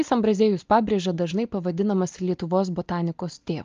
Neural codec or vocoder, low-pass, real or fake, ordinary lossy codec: none; 7.2 kHz; real; Opus, 24 kbps